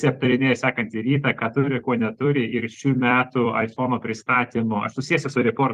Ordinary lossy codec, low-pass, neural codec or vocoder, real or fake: Opus, 32 kbps; 14.4 kHz; vocoder, 44.1 kHz, 128 mel bands every 256 samples, BigVGAN v2; fake